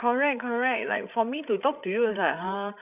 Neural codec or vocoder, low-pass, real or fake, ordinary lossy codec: codec, 16 kHz, 16 kbps, FreqCodec, larger model; 3.6 kHz; fake; none